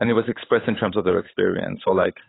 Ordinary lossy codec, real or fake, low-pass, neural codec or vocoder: AAC, 16 kbps; fake; 7.2 kHz; codec, 16 kHz, 8 kbps, FunCodec, trained on Chinese and English, 25 frames a second